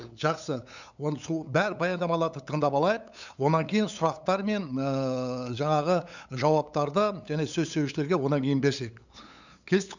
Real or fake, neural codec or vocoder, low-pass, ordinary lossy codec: fake; codec, 16 kHz, 8 kbps, FunCodec, trained on LibriTTS, 25 frames a second; 7.2 kHz; none